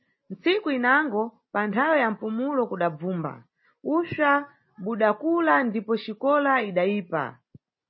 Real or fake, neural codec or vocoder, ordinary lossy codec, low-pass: real; none; MP3, 24 kbps; 7.2 kHz